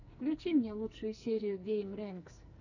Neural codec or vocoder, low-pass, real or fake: codec, 44.1 kHz, 2.6 kbps, SNAC; 7.2 kHz; fake